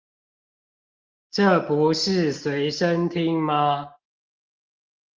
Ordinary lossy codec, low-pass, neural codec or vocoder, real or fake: Opus, 24 kbps; 7.2 kHz; none; real